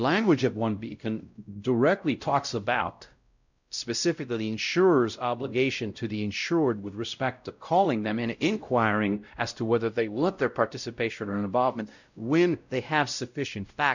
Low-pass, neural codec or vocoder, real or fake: 7.2 kHz; codec, 16 kHz, 0.5 kbps, X-Codec, WavLM features, trained on Multilingual LibriSpeech; fake